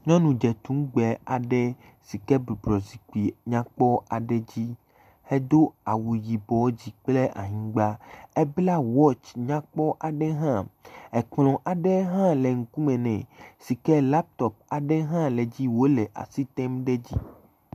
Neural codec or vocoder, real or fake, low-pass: none; real; 14.4 kHz